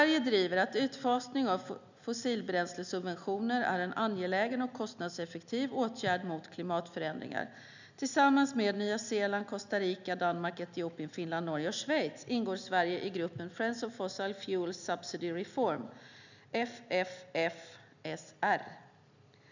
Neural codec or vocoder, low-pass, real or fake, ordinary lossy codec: none; 7.2 kHz; real; none